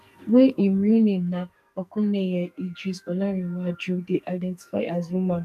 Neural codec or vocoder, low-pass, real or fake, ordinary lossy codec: codec, 44.1 kHz, 2.6 kbps, SNAC; 14.4 kHz; fake; none